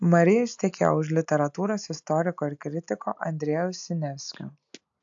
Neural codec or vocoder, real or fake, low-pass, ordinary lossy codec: none; real; 7.2 kHz; AAC, 64 kbps